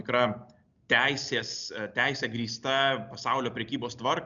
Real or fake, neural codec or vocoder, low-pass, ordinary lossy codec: real; none; 7.2 kHz; MP3, 96 kbps